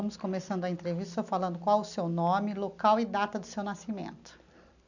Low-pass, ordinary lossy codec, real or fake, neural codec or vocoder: 7.2 kHz; none; real; none